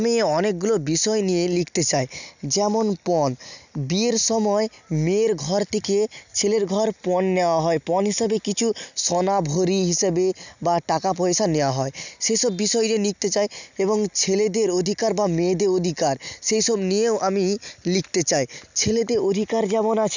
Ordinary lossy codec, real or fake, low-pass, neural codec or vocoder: none; real; 7.2 kHz; none